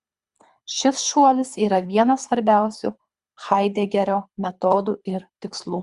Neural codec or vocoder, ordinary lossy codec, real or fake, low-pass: codec, 24 kHz, 3 kbps, HILCodec; Opus, 64 kbps; fake; 10.8 kHz